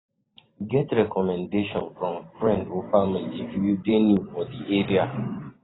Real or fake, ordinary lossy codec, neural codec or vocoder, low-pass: fake; AAC, 16 kbps; vocoder, 44.1 kHz, 128 mel bands every 512 samples, BigVGAN v2; 7.2 kHz